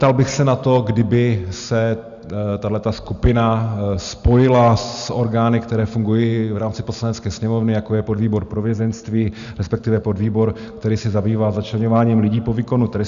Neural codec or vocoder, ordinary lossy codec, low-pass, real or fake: none; AAC, 96 kbps; 7.2 kHz; real